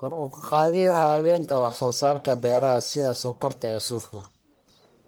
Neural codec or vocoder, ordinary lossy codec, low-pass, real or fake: codec, 44.1 kHz, 1.7 kbps, Pupu-Codec; none; none; fake